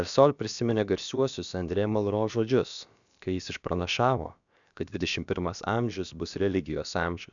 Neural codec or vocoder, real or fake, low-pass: codec, 16 kHz, about 1 kbps, DyCAST, with the encoder's durations; fake; 7.2 kHz